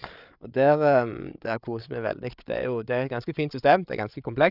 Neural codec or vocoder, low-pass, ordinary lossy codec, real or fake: codec, 16 kHz, 8 kbps, FreqCodec, larger model; 5.4 kHz; none; fake